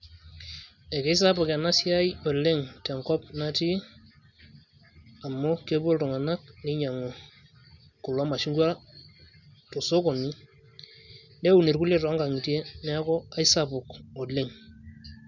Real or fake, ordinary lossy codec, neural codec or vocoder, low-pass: real; none; none; 7.2 kHz